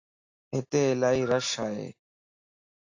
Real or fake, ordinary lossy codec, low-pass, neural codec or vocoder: real; AAC, 48 kbps; 7.2 kHz; none